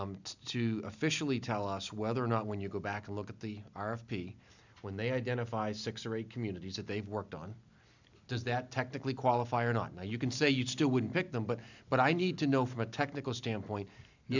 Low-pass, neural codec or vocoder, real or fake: 7.2 kHz; none; real